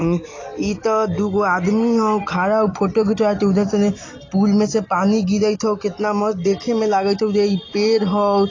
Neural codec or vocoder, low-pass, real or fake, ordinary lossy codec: none; 7.2 kHz; real; AAC, 32 kbps